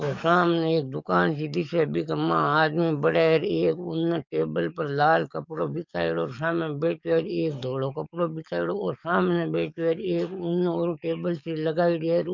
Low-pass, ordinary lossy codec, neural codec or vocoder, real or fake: 7.2 kHz; MP3, 64 kbps; codec, 44.1 kHz, 7.8 kbps, DAC; fake